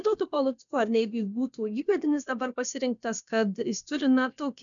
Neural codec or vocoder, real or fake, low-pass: codec, 16 kHz, about 1 kbps, DyCAST, with the encoder's durations; fake; 7.2 kHz